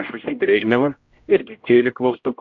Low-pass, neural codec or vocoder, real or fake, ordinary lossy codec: 7.2 kHz; codec, 16 kHz, 0.5 kbps, X-Codec, HuBERT features, trained on general audio; fake; AAC, 48 kbps